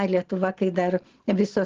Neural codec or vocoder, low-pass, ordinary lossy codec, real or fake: none; 7.2 kHz; Opus, 16 kbps; real